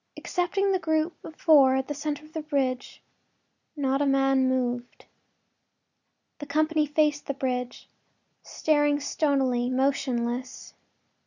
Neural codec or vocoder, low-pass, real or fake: none; 7.2 kHz; real